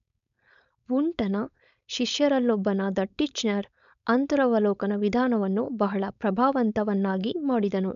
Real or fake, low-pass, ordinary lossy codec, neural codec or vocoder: fake; 7.2 kHz; none; codec, 16 kHz, 4.8 kbps, FACodec